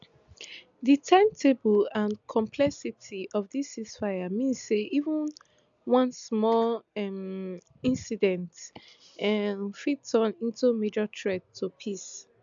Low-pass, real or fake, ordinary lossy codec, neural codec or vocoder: 7.2 kHz; real; MP3, 64 kbps; none